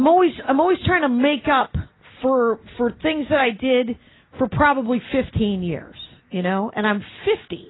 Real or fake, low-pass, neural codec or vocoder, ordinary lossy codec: real; 7.2 kHz; none; AAC, 16 kbps